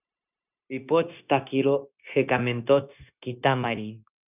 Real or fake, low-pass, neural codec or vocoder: fake; 3.6 kHz; codec, 16 kHz, 0.9 kbps, LongCat-Audio-Codec